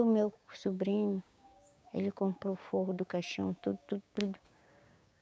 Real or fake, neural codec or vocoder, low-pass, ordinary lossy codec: fake; codec, 16 kHz, 6 kbps, DAC; none; none